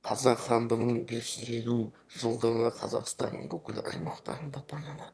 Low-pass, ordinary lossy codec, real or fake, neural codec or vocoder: none; none; fake; autoencoder, 22.05 kHz, a latent of 192 numbers a frame, VITS, trained on one speaker